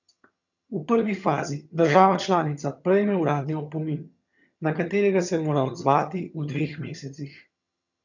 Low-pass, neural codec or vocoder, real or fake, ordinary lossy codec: 7.2 kHz; vocoder, 22.05 kHz, 80 mel bands, HiFi-GAN; fake; none